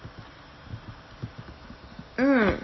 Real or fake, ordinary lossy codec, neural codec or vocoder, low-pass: real; MP3, 24 kbps; none; 7.2 kHz